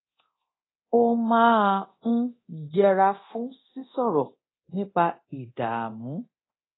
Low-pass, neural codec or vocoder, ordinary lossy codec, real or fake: 7.2 kHz; codec, 24 kHz, 0.9 kbps, DualCodec; AAC, 16 kbps; fake